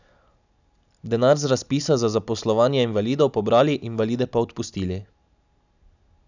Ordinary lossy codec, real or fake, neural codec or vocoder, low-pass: none; real; none; 7.2 kHz